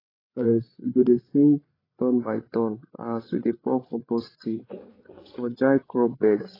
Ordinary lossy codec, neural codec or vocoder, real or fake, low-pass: AAC, 24 kbps; codec, 16 kHz, 8 kbps, FreqCodec, larger model; fake; 5.4 kHz